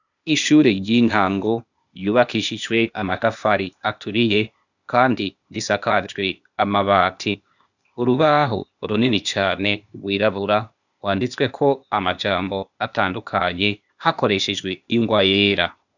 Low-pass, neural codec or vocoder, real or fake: 7.2 kHz; codec, 16 kHz, 0.8 kbps, ZipCodec; fake